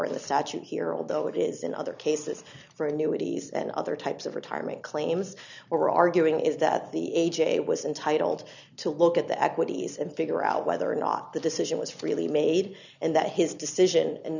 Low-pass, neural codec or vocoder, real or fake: 7.2 kHz; none; real